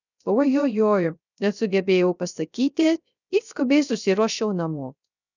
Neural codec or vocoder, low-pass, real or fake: codec, 16 kHz, 0.3 kbps, FocalCodec; 7.2 kHz; fake